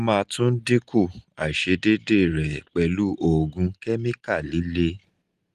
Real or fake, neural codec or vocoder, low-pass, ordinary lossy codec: real; none; 14.4 kHz; Opus, 24 kbps